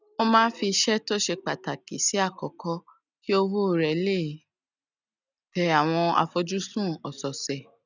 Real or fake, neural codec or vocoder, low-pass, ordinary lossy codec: real; none; 7.2 kHz; none